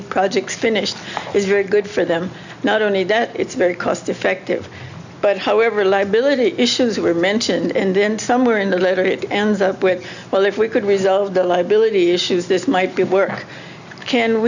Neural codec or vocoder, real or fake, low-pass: none; real; 7.2 kHz